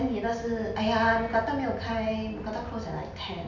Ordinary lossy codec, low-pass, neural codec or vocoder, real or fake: none; 7.2 kHz; none; real